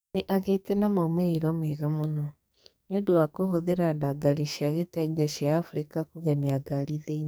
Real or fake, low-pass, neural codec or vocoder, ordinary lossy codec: fake; none; codec, 44.1 kHz, 2.6 kbps, SNAC; none